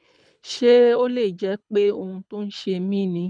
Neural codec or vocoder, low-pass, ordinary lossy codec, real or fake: codec, 24 kHz, 6 kbps, HILCodec; 9.9 kHz; none; fake